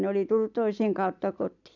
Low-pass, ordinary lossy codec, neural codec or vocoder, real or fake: 7.2 kHz; none; none; real